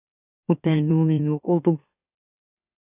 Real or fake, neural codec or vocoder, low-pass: fake; autoencoder, 44.1 kHz, a latent of 192 numbers a frame, MeloTTS; 3.6 kHz